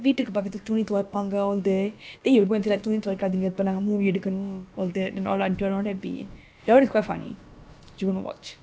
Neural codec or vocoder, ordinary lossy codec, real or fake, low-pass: codec, 16 kHz, about 1 kbps, DyCAST, with the encoder's durations; none; fake; none